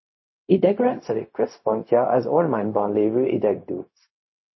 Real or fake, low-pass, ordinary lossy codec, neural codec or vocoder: fake; 7.2 kHz; MP3, 24 kbps; codec, 16 kHz, 0.4 kbps, LongCat-Audio-Codec